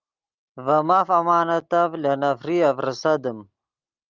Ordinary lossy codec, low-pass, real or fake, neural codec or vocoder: Opus, 32 kbps; 7.2 kHz; real; none